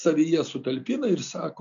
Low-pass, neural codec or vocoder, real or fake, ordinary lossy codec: 7.2 kHz; none; real; MP3, 64 kbps